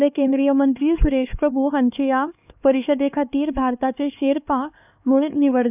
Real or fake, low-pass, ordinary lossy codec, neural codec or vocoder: fake; 3.6 kHz; none; codec, 16 kHz, 2 kbps, X-Codec, HuBERT features, trained on LibriSpeech